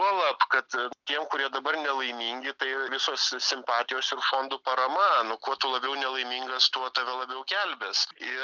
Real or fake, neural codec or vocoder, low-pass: real; none; 7.2 kHz